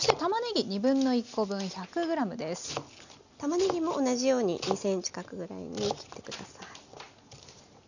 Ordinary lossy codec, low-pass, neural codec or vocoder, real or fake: none; 7.2 kHz; none; real